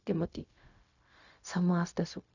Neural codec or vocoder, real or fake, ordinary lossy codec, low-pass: codec, 16 kHz, 0.4 kbps, LongCat-Audio-Codec; fake; none; 7.2 kHz